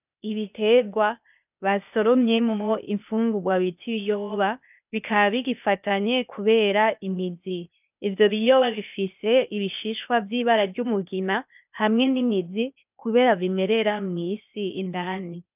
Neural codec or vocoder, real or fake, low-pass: codec, 16 kHz, 0.8 kbps, ZipCodec; fake; 3.6 kHz